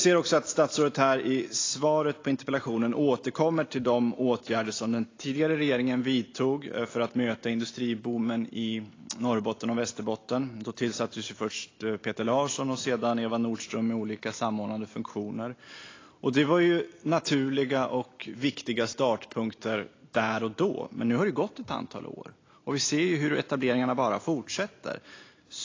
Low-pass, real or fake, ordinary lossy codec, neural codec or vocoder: 7.2 kHz; real; AAC, 32 kbps; none